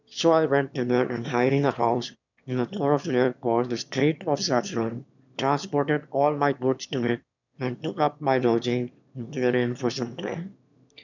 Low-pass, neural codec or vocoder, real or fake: 7.2 kHz; autoencoder, 22.05 kHz, a latent of 192 numbers a frame, VITS, trained on one speaker; fake